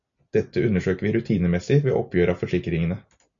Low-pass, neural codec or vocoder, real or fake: 7.2 kHz; none; real